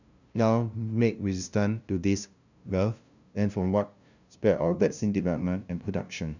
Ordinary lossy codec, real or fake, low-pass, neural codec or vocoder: none; fake; 7.2 kHz; codec, 16 kHz, 0.5 kbps, FunCodec, trained on LibriTTS, 25 frames a second